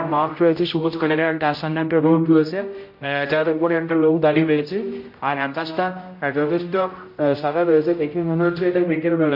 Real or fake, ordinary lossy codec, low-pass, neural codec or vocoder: fake; MP3, 32 kbps; 5.4 kHz; codec, 16 kHz, 0.5 kbps, X-Codec, HuBERT features, trained on general audio